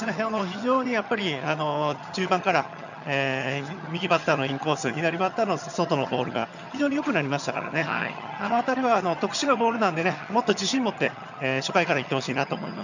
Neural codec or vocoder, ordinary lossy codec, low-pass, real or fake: vocoder, 22.05 kHz, 80 mel bands, HiFi-GAN; none; 7.2 kHz; fake